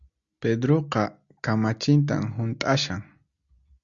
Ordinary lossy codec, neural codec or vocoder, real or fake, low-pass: Opus, 64 kbps; none; real; 7.2 kHz